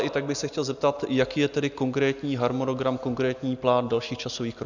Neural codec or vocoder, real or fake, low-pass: none; real; 7.2 kHz